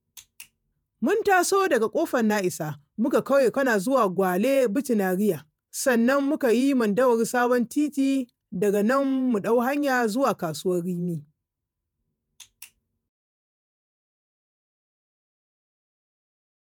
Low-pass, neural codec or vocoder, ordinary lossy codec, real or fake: none; vocoder, 48 kHz, 128 mel bands, Vocos; none; fake